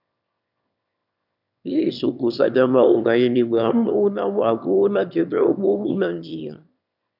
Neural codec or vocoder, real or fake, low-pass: autoencoder, 22.05 kHz, a latent of 192 numbers a frame, VITS, trained on one speaker; fake; 5.4 kHz